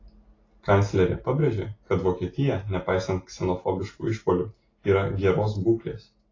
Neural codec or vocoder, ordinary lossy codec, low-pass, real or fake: none; AAC, 32 kbps; 7.2 kHz; real